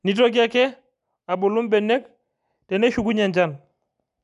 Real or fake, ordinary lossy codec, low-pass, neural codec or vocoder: real; none; 10.8 kHz; none